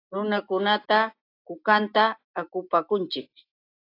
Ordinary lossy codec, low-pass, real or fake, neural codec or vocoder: AAC, 32 kbps; 5.4 kHz; real; none